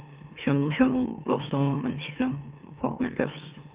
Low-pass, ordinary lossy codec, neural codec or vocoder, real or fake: 3.6 kHz; Opus, 24 kbps; autoencoder, 44.1 kHz, a latent of 192 numbers a frame, MeloTTS; fake